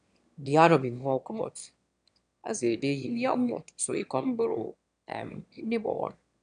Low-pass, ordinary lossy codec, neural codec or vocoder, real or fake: 9.9 kHz; none; autoencoder, 22.05 kHz, a latent of 192 numbers a frame, VITS, trained on one speaker; fake